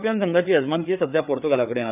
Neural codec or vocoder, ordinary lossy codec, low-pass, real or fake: codec, 16 kHz, 4 kbps, FreqCodec, larger model; none; 3.6 kHz; fake